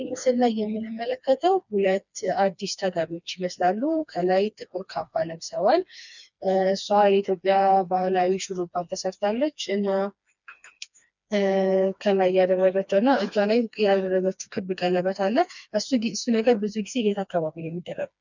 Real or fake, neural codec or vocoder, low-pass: fake; codec, 16 kHz, 2 kbps, FreqCodec, smaller model; 7.2 kHz